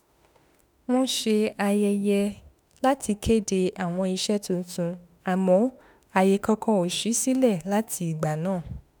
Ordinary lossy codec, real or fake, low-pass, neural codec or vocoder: none; fake; none; autoencoder, 48 kHz, 32 numbers a frame, DAC-VAE, trained on Japanese speech